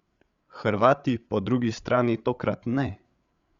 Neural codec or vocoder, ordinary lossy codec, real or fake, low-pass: codec, 16 kHz, 8 kbps, FreqCodec, larger model; Opus, 64 kbps; fake; 7.2 kHz